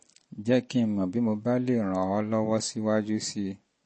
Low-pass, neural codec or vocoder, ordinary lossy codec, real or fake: 9.9 kHz; none; MP3, 32 kbps; real